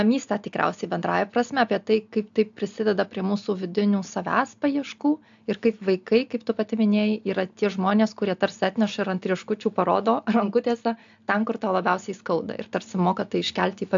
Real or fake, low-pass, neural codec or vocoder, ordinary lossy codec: real; 7.2 kHz; none; AAC, 64 kbps